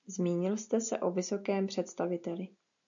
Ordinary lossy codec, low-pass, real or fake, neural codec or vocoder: AAC, 64 kbps; 7.2 kHz; real; none